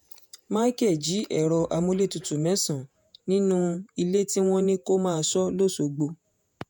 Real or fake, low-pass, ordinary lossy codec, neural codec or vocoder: fake; none; none; vocoder, 48 kHz, 128 mel bands, Vocos